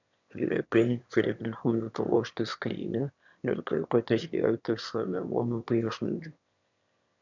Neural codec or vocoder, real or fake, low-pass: autoencoder, 22.05 kHz, a latent of 192 numbers a frame, VITS, trained on one speaker; fake; 7.2 kHz